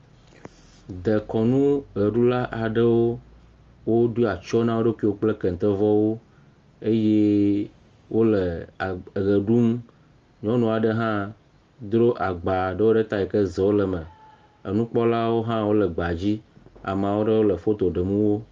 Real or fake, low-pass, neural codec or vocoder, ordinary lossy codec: real; 7.2 kHz; none; Opus, 32 kbps